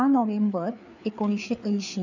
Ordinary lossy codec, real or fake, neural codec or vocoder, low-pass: none; fake; codec, 16 kHz, 4 kbps, FreqCodec, larger model; 7.2 kHz